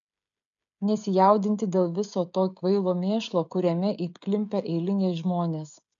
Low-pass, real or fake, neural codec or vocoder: 7.2 kHz; fake; codec, 16 kHz, 16 kbps, FreqCodec, smaller model